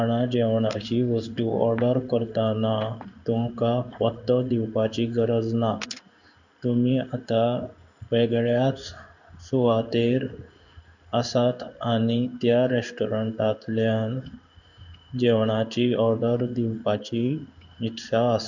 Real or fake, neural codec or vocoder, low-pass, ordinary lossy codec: fake; codec, 16 kHz in and 24 kHz out, 1 kbps, XY-Tokenizer; 7.2 kHz; none